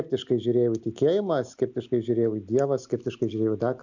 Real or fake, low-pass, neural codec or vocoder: real; 7.2 kHz; none